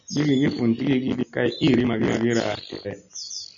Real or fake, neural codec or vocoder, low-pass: real; none; 7.2 kHz